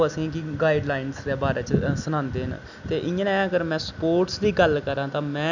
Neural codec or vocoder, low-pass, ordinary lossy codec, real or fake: none; 7.2 kHz; none; real